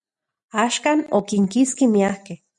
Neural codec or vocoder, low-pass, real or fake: none; 10.8 kHz; real